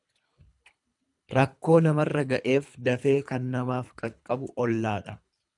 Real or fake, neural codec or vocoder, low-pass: fake; codec, 24 kHz, 3 kbps, HILCodec; 10.8 kHz